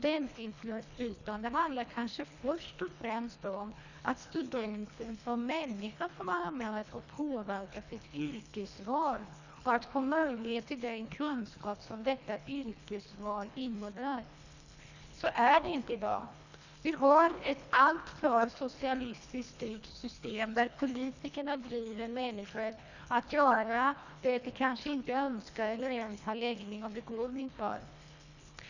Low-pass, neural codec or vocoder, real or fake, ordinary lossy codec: 7.2 kHz; codec, 24 kHz, 1.5 kbps, HILCodec; fake; none